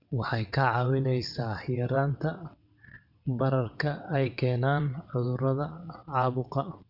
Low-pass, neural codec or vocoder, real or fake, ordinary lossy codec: 5.4 kHz; vocoder, 22.05 kHz, 80 mel bands, WaveNeXt; fake; none